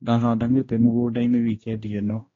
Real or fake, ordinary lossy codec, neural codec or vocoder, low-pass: fake; AAC, 32 kbps; codec, 16 kHz, 1 kbps, X-Codec, HuBERT features, trained on general audio; 7.2 kHz